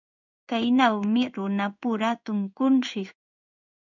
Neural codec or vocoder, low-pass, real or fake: codec, 16 kHz in and 24 kHz out, 1 kbps, XY-Tokenizer; 7.2 kHz; fake